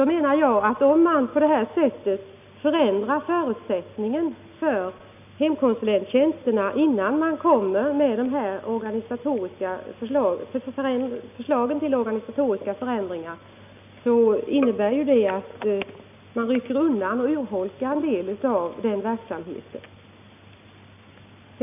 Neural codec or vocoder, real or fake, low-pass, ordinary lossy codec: none; real; 3.6 kHz; none